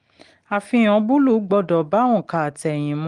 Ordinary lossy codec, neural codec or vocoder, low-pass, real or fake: Opus, 24 kbps; none; 9.9 kHz; real